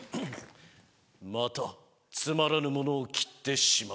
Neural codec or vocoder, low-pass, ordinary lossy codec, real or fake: none; none; none; real